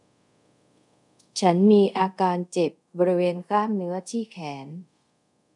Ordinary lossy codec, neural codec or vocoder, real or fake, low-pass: none; codec, 24 kHz, 0.5 kbps, DualCodec; fake; 10.8 kHz